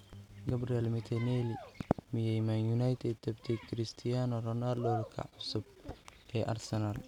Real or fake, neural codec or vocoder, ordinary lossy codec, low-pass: real; none; none; 19.8 kHz